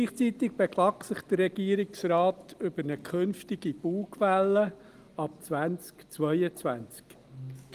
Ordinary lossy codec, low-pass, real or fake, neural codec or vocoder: Opus, 24 kbps; 14.4 kHz; real; none